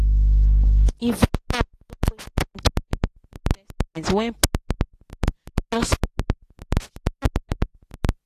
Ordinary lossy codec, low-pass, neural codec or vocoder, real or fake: AAC, 64 kbps; 14.4 kHz; none; real